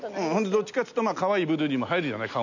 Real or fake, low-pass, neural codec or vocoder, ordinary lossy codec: real; 7.2 kHz; none; none